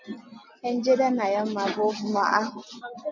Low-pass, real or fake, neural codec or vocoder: 7.2 kHz; real; none